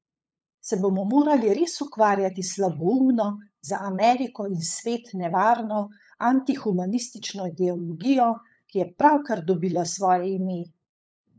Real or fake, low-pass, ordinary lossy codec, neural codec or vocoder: fake; none; none; codec, 16 kHz, 8 kbps, FunCodec, trained on LibriTTS, 25 frames a second